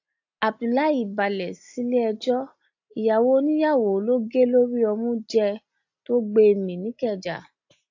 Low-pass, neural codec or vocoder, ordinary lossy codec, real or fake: 7.2 kHz; none; AAC, 48 kbps; real